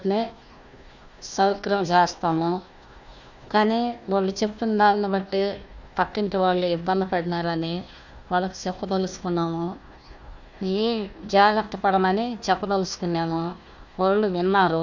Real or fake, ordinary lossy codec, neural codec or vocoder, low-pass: fake; none; codec, 16 kHz, 1 kbps, FunCodec, trained on Chinese and English, 50 frames a second; 7.2 kHz